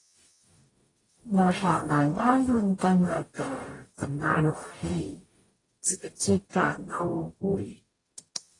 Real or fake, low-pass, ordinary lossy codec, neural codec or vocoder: fake; 10.8 kHz; AAC, 32 kbps; codec, 44.1 kHz, 0.9 kbps, DAC